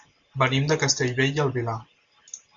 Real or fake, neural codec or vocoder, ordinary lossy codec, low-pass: real; none; MP3, 64 kbps; 7.2 kHz